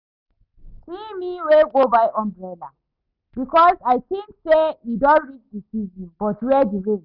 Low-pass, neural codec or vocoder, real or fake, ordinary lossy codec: 5.4 kHz; none; real; none